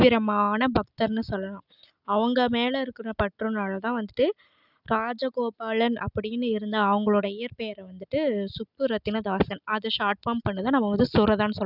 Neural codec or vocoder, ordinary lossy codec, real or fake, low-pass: none; none; real; 5.4 kHz